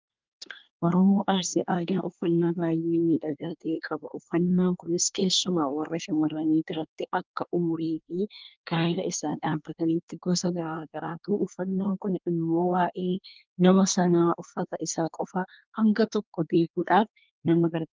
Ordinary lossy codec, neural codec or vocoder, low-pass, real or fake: Opus, 32 kbps; codec, 24 kHz, 1 kbps, SNAC; 7.2 kHz; fake